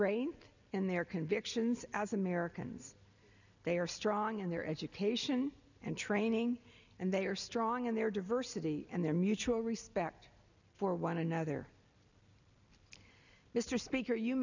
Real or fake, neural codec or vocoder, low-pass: real; none; 7.2 kHz